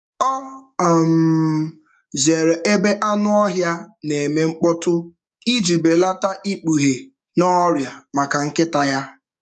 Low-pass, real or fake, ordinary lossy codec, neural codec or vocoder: 10.8 kHz; fake; none; codec, 44.1 kHz, 7.8 kbps, DAC